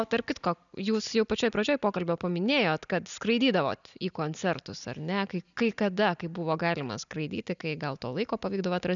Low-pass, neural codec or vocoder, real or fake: 7.2 kHz; none; real